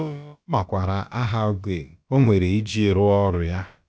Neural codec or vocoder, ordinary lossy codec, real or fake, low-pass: codec, 16 kHz, about 1 kbps, DyCAST, with the encoder's durations; none; fake; none